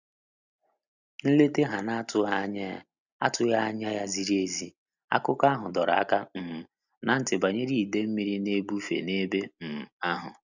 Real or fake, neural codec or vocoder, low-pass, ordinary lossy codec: real; none; 7.2 kHz; none